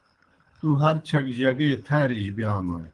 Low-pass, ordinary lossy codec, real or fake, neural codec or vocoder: 10.8 kHz; Opus, 24 kbps; fake; codec, 24 kHz, 3 kbps, HILCodec